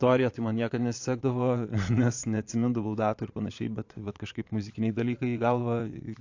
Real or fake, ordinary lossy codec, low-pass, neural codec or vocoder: fake; AAC, 48 kbps; 7.2 kHz; vocoder, 22.05 kHz, 80 mel bands, Vocos